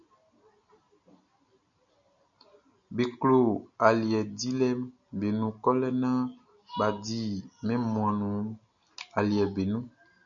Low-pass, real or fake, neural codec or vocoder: 7.2 kHz; real; none